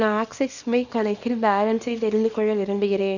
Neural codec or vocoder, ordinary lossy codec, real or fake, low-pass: codec, 24 kHz, 0.9 kbps, WavTokenizer, small release; none; fake; 7.2 kHz